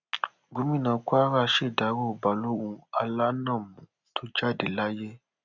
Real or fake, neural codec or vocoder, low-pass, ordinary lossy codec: real; none; 7.2 kHz; none